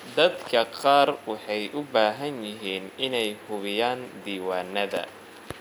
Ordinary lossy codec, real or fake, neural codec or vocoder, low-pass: none; real; none; 19.8 kHz